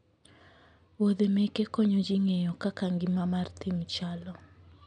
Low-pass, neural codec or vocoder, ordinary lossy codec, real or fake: 9.9 kHz; none; none; real